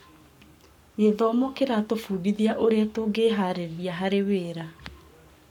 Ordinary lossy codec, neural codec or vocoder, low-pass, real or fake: none; codec, 44.1 kHz, 7.8 kbps, Pupu-Codec; 19.8 kHz; fake